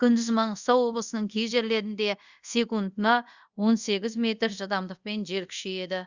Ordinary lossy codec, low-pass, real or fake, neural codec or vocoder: Opus, 64 kbps; 7.2 kHz; fake; codec, 24 kHz, 0.5 kbps, DualCodec